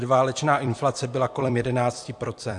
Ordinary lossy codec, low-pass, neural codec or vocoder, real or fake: AAC, 64 kbps; 10.8 kHz; vocoder, 44.1 kHz, 128 mel bands every 256 samples, BigVGAN v2; fake